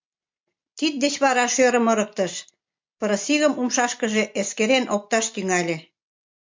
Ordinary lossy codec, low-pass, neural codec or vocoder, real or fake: MP3, 48 kbps; 7.2 kHz; none; real